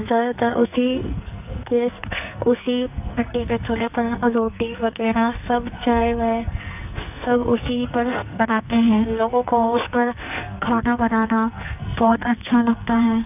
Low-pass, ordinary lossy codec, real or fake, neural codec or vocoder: 3.6 kHz; none; fake; codec, 44.1 kHz, 2.6 kbps, SNAC